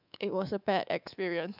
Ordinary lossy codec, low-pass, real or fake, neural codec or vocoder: none; 5.4 kHz; fake; codec, 24 kHz, 3.1 kbps, DualCodec